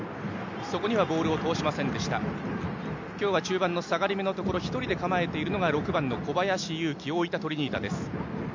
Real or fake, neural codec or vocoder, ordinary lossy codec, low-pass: real; none; MP3, 64 kbps; 7.2 kHz